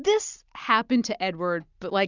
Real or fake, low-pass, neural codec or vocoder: real; 7.2 kHz; none